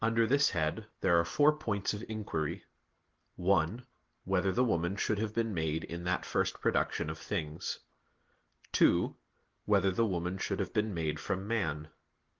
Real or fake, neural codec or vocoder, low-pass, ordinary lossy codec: real; none; 7.2 kHz; Opus, 16 kbps